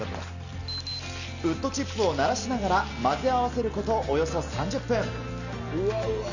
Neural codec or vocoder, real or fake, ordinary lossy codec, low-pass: none; real; none; 7.2 kHz